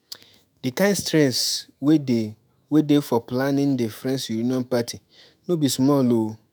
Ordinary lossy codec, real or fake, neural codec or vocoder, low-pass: none; fake; autoencoder, 48 kHz, 128 numbers a frame, DAC-VAE, trained on Japanese speech; none